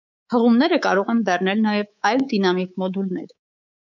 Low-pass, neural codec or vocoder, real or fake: 7.2 kHz; codec, 24 kHz, 3.1 kbps, DualCodec; fake